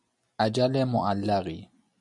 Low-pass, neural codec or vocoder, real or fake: 10.8 kHz; none; real